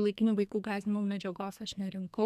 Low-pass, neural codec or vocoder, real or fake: 14.4 kHz; codec, 32 kHz, 1.9 kbps, SNAC; fake